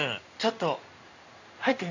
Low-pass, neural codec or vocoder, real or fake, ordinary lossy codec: 7.2 kHz; codec, 16 kHz in and 24 kHz out, 1 kbps, XY-Tokenizer; fake; none